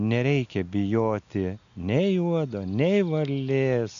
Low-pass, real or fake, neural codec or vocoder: 7.2 kHz; real; none